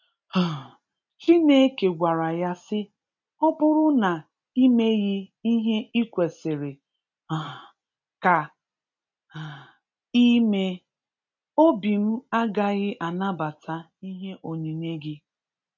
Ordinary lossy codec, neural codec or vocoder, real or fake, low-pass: none; none; real; 7.2 kHz